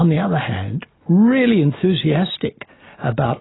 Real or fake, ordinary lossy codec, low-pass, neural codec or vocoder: real; AAC, 16 kbps; 7.2 kHz; none